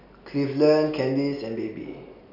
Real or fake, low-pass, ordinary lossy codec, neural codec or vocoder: real; 5.4 kHz; none; none